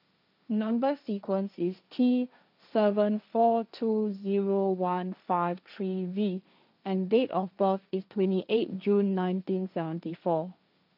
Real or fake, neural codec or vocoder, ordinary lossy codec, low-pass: fake; codec, 16 kHz, 1.1 kbps, Voila-Tokenizer; none; 5.4 kHz